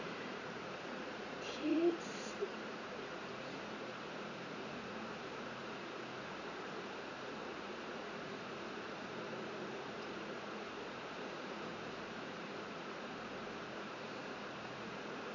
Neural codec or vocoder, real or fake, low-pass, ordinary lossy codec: vocoder, 44.1 kHz, 80 mel bands, Vocos; fake; 7.2 kHz; none